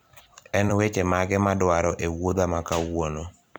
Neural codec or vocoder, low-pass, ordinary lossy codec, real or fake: vocoder, 44.1 kHz, 128 mel bands every 256 samples, BigVGAN v2; none; none; fake